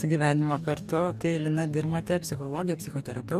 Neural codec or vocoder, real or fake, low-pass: codec, 44.1 kHz, 2.6 kbps, DAC; fake; 14.4 kHz